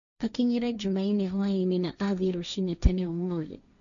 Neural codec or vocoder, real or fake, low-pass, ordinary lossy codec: codec, 16 kHz, 1.1 kbps, Voila-Tokenizer; fake; 7.2 kHz; none